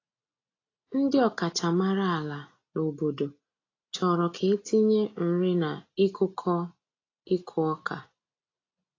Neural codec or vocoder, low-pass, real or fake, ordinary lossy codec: none; 7.2 kHz; real; AAC, 32 kbps